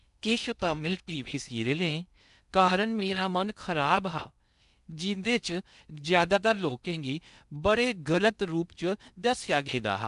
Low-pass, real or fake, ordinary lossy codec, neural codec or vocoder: 10.8 kHz; fake; none; codec, 16 kHz in and 24 kHz out, 0.6 kbps, FocalCodec, streaming, 2048 codes